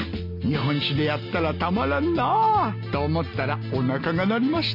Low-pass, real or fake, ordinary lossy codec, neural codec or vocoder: 5.4 kHz; real; none; none